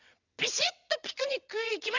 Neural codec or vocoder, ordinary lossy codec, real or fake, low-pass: vocoder, 22.05 kHz, 80 mel bands, WaveNeXt; Opus, 64 kbps; fake; 7.2 kHz